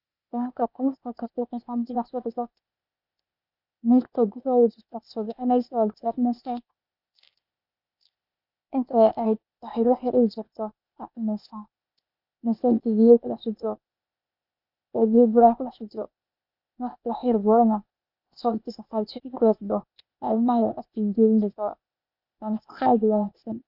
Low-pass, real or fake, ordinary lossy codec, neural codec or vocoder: 5.4 kHz; fake; none; codec, 16 kHz, 0.8 kbps, ZipCodec